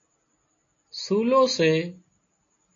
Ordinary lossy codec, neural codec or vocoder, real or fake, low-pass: AAC, 48 kbps; none; real; 7.2 kHz